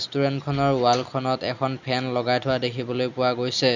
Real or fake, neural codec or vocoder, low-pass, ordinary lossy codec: real; none; 7.2 kHz; none